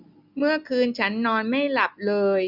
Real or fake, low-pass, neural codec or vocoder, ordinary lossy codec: real; 5.4 kHz; none; none